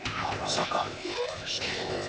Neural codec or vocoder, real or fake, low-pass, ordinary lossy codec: codec, 16 kHz, 0.8 kbps, ZipCodec; fake; none; none